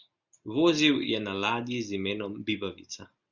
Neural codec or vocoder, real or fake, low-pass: none; real; 7.2 kHz